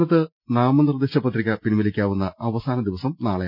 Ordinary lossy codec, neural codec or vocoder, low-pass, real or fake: none; none; 5.4 kHz; real